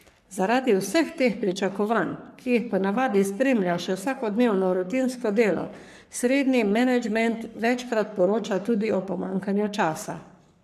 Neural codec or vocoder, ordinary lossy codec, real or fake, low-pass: codec, 44.1 kHz, 3.4 kbps, Pupu-Codec; none; fake; 14.4 kHz